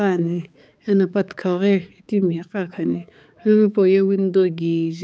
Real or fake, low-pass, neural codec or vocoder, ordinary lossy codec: fake; none; codec, 16 kHz, 4 kbps, X-Codec, HuBERT features, trained on balanced general audio; none